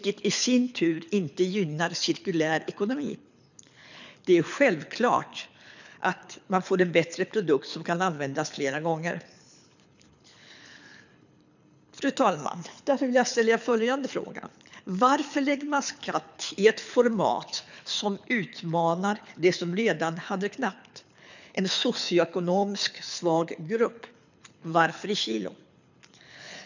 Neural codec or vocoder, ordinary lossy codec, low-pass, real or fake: codec, 24 kHz, 6 kbps, HILCodec; none; 7.2 kHz; fake